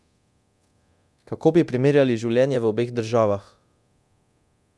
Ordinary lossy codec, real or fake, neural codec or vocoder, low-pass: none; fake; codec, 24 kHz, 0.9 kbps, DualCodec; none